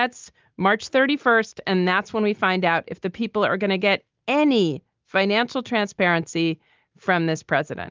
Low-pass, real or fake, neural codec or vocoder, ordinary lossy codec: 7.2 kHz; real; none; Opus, 24 kbps